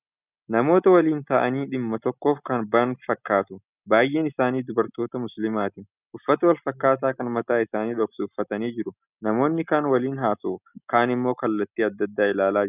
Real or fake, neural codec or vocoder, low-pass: real; none; 3.6 kHz